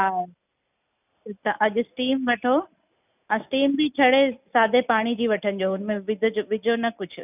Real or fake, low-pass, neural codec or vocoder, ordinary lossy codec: real; 3.6 kHz; none; none